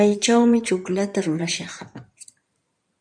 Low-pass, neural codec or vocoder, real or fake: 9.9 kHz; codec, 16 kHz in and 24 kHz out, 2.2 kbps, FireRedTTS-2 codec; fake